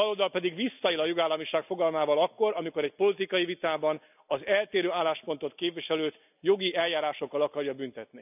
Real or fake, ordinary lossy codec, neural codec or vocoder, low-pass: real; none; none; 3.6 kHz